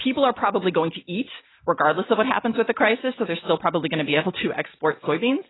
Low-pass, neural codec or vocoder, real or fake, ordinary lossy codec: 7.2 kHz; none; real; AAC, 16 kbps